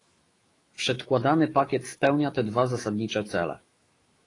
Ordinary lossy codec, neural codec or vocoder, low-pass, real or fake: AAC, 32 kbps; autoencoder, 48 kHz, 128 numbers a frame, DAC-VAE, trained on Japanese speech; 10.8 kHz; fake